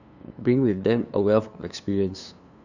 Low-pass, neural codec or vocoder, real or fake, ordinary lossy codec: 7.2 kHz; codec, 16 kHz, 2 kbps, FunCodec, trained on LibriTTS, 25 frames a second; fake; none